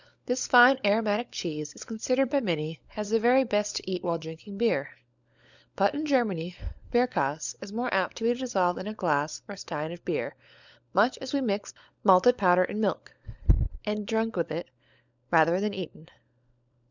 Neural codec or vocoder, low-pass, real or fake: codec, 16 kHz, 16 kbps, FunCodec, trained on LibriTTS, 50 frames a second; 7.2 kHz; fake